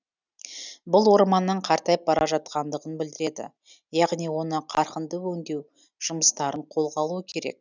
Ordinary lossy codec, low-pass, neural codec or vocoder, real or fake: none; 7.2 kHz; none; real